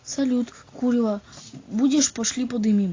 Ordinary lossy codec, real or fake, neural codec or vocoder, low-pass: AAC, 32 kbps; real; none; 7.2 kHz